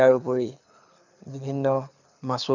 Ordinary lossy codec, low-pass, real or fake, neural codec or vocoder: none; 7.2 kHz; fake; codec, 24 kHz, 6 kbps, HILCodec